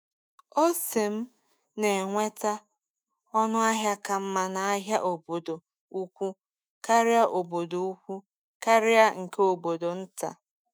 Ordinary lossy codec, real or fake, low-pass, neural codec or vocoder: none; fake; none; autoencoder, 48 kHz, 128 numbers a frame, DAC-VAE, trained on Japanese speech